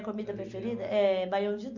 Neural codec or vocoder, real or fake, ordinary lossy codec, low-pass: none; real; none; 7.2 kHz